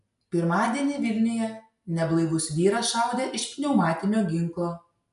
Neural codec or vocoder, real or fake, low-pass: none; real; 10.8 kHz